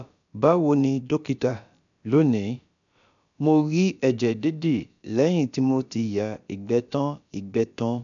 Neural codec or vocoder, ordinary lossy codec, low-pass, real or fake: codec, 16 kHz, about 1 kbps, DyCAST, with the encoder's durations; none; 7.2 kHz; fake